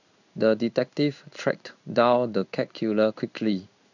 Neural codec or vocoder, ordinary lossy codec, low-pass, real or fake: codec, 16 kHz in and 24 kHz out, 1 kbps, XY-Tokenizer; none; 7.2 kHz; fake